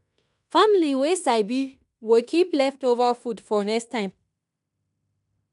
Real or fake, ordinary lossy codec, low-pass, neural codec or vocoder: fake; none; 10.8 kHz; codec, 16 kHz in and 24 kHz out, 0.9 kbps, LongCat-Audio-Codec, fine tuned four codebook decoder